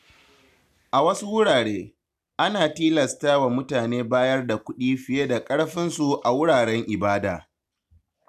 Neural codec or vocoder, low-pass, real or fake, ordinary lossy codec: none; 14.4 kHz; real; none